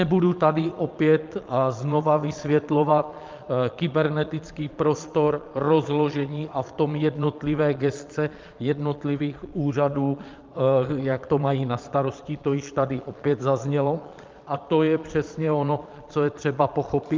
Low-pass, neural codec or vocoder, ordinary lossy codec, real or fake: 7.2 kHz; vocoder, 22.05 kHz, 80 mel bands, Vocos; Opus, 24 kbps; fake